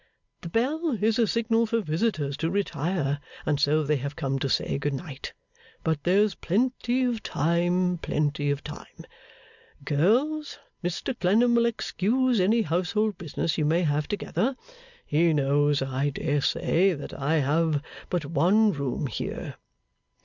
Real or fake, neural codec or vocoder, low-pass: real; none; 7.2 kHz